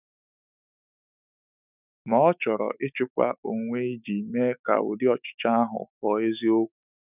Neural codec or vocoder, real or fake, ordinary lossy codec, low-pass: autoencoder, 48 kHz, 128 numbers a frame, DAC-VAE, trained on Japanese speech; fake; none; 3.6 kHz